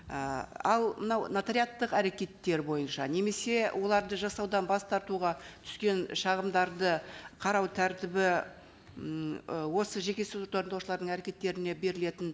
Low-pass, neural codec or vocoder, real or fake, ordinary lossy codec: none; none; real; none